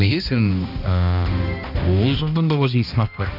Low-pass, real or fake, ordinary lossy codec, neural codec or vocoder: 5.4 kHz; fake; none; codec, 16 kHz, 1 kbps, X-Codec, HuBERT features, trained on balanced general audio